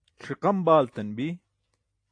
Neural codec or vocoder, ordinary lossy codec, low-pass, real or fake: none; AAC, 48 kbps; 9.9 kHz; real